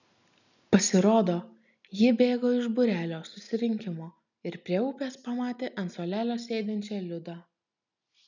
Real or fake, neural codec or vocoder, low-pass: real; none; 7.2 kHz